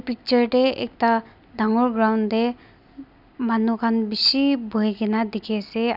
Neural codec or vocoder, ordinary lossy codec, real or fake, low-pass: none; none; real; 5.4 kHz